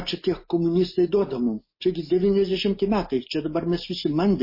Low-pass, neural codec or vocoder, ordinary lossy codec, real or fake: 5.4 kHz; none; MP3, 24 kbps; real